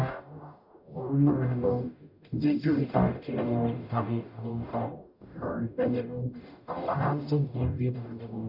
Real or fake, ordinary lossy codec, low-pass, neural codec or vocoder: fake; none; 5.4 kHz; codec, 44.1 kHz, 0.9 kbps, DAC